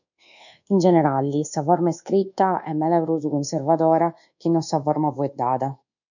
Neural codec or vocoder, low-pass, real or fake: codec, 24 kHz, 1.2 kbps, DualCodec; 7.2 kHz; fake